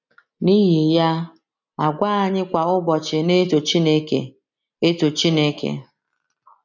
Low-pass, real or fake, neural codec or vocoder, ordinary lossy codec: 7.2 kHz; real; none; none